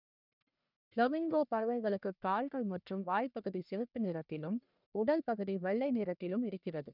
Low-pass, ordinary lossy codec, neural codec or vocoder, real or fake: 5.4 kHz; none; codec, 44.1 kHz, 1.7 kbps, Pupu-Codec; fake